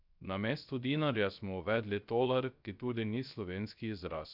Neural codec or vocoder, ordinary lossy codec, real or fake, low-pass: codec, 16 kHz, 0.3 kbps, FocalCodec; none; fake; 5.4 kHz